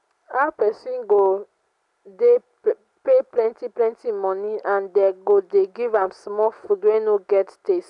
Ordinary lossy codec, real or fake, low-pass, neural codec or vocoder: none; real; 10.8 kHz; none